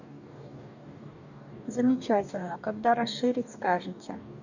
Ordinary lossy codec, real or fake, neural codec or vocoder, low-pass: none; fake; codec, 44.1 kHz, 2.6 kbps, DAC; 7.2 kHz